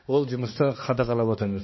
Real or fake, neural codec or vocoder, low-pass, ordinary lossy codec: fake; codec, 16 kHz, 2 kbps, X-Codec, HuBERT features, trained on balanced general audio; 7.2 kHz; MP3, 24 kbps